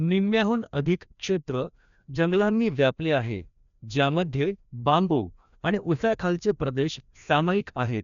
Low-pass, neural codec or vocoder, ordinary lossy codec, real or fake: 7.2 kHz; codec, 16 kHz, 1 kbps, FreqCodec, larger model; none; fake